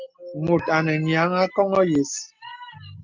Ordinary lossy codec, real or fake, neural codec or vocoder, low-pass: Opus, 24 kbps; real; none; 7.2 kHz